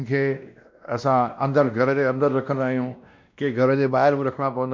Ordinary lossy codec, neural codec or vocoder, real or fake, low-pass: MP3, 48 kbps; codec, 16 kHz, 1 kbps, X-Codec, WavLM features, trained on Multilingual LibriSpeech; fake; 7.2 kHz